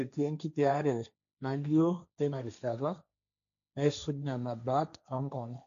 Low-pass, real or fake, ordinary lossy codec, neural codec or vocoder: 7.2 kHz; fake; none; codec, 16 kHz, 1.1 kbps, Voila-Tokenizer